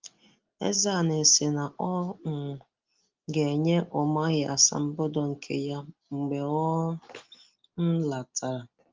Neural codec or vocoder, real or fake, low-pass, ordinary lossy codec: none; real; 7.2 kHz; Opus, 24 kbps